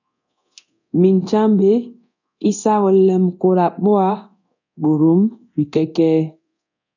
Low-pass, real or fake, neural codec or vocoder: 7.2 kHz; fake; codec, 24 kHz, 0.9 kbps, DualCodec